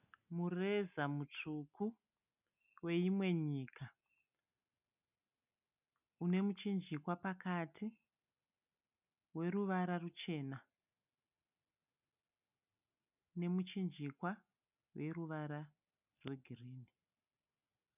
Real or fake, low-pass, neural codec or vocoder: real; 3.6 kHz; none